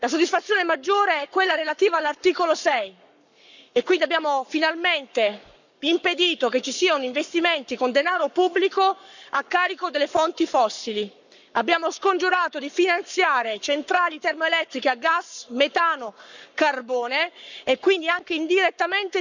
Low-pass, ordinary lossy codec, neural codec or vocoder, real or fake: 7.2 kHz; none; codec, 44.1 kHz, 7.8 kbps, Pupu-Codec; fake